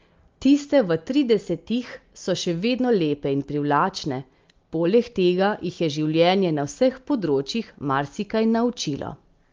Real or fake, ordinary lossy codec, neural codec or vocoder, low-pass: real; Opus, 32 kbps; none; 7.2 kHz